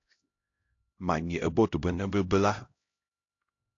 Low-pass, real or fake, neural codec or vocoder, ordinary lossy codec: 7.2 kHz; fake; codec, 16 kHz, 0.5 kbps, X-Codec, HuBERT features, trained on LibriSpeech; AAC, 48 kbps